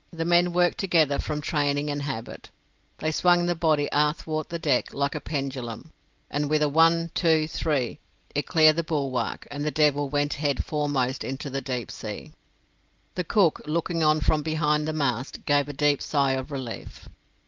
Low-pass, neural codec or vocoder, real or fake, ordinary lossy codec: 7.2 kHz; none; real; Opus, 24 kbps